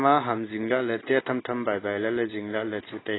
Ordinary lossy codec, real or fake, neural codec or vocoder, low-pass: AAC, 16 kbps; real; none; 7.2 kHz